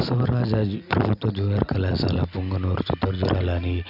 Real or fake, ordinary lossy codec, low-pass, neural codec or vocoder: real; none; 5.4 kHz; none